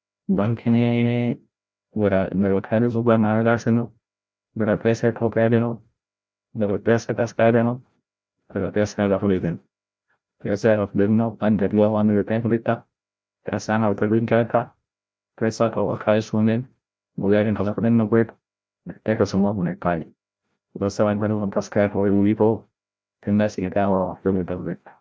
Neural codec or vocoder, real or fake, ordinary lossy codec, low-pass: codec, 16 kHz, 0.5 kbps, FreqCodec, larger model; fake; none; none